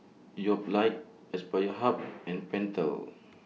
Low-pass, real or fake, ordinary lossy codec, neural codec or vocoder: none; real; none; none